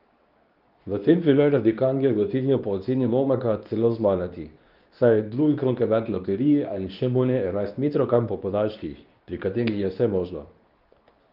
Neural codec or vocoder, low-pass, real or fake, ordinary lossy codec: codec, 24 kHz, 0.9 kbps, WavTokenizer, medium speech release version 2; 5.4 kHz; fake; Opus, 32 kbps